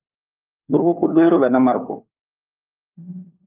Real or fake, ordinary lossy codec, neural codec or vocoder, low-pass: fake; Opus, 24 kbps; codec, 16 kHz, 4 kbps, FunCodec, trained on LibriTTS, 50 frames a second; 3.6 kHz